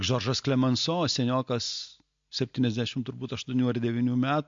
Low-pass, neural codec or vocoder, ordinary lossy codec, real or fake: 7.2 kHz; none; MP3, 48 kbps; real